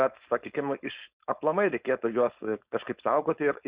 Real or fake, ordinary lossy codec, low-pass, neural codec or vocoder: fake; Opus, 64 kbps; 3.6 kHz; codec, 16 kHz, 4.8 kbps, FACodec